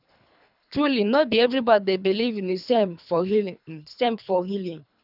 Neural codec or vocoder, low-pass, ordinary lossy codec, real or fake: codec, 24 kHz, 3 kbps, HILCodec; 5.4 kHz; none; fake